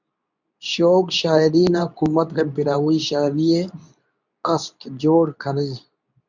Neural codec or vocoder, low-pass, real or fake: codec, 24 kHz, 0.9 kbps, WavTokenizer, medium speech release version 2; 7.2 kHz; fake